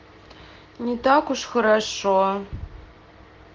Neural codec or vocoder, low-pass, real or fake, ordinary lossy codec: none; 7.2 kHz; real; Opus, 16 kbps